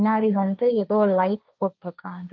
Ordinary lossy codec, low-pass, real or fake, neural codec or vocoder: none; none; fake; codec, 16 kHz, 1.1 kbps, Voila-Tokenizer